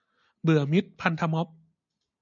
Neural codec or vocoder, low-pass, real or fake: none; 7.2 kHz; real